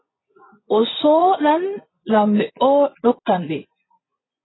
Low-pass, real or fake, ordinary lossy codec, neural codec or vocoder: 7.2 kHz; fake; AAC, 16 kbps; vocoder, 24 kHz, 100 mel bands, Vocos